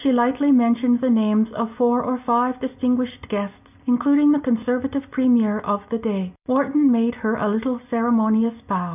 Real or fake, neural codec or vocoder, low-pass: real; none; 3.6 kHz